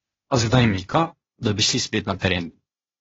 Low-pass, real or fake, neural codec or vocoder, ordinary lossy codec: 7.2 kHz; fake; codec, 16 kHz, 0.8 kbps, ZipCodec; AAC, 24 kbps